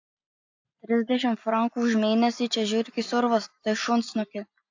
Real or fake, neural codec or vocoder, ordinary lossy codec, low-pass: real; none; AAC, 48 kbps; 7.2 kHz